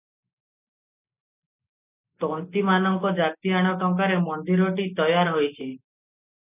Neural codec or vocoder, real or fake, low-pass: none; real; 3.6 kHz